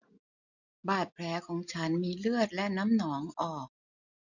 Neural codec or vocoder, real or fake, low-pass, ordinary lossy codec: none; real; 7.2 kHz; none